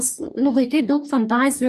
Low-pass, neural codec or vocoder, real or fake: 14.4 kHz; codec, 44.1 kHz, 2.6 kbps, DAC; fake